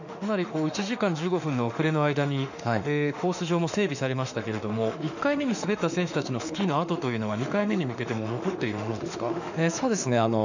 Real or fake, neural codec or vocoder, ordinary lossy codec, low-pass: fake; autoencoder, 48 kHz, 32 numbers a frame, DAC-VAE, trained on Japanese speech; none; 7.2 kHz